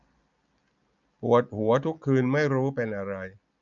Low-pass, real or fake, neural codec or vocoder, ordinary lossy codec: 7.2 kHz; real; none; none